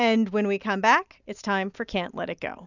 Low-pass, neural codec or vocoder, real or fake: 7.2 kHz; none; real